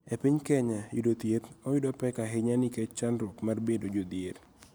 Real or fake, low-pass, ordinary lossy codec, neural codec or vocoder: real; none; none; none